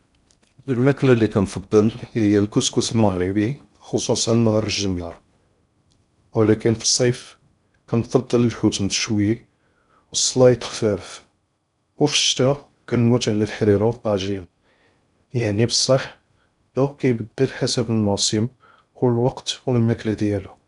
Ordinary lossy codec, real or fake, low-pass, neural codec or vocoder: none; fake; 10.8 kHz; codec, 16 kHz in and 24 kHz out, 0.6 kbps, FocalCodec, streaming, 4096 codes